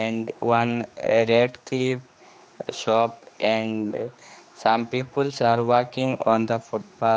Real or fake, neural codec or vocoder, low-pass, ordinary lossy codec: fake; codec, 16 kHz, 2 kbps, X-Codec, HuBERT features, trained on general audio; none; none